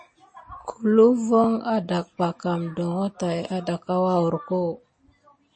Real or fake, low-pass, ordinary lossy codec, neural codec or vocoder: real; 9.9 kHz; MP3, 32 kbps; none